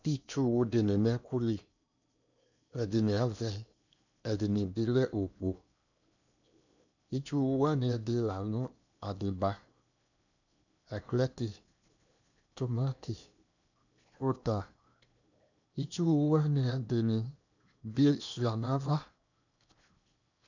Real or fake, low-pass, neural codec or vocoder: fake; 7.2 kHz; codec, 16 kHz in and 24 kHz out, 0.8 kbps, FocalCodec, streaming, 65536 codes